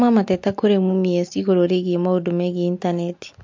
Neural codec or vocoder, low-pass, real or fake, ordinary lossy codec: none; 7.2 kHz; real; MP3, 48 kbps